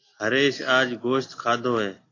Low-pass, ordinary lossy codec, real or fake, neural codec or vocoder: 7.2 kHz; AAC, 32 kbps; real; none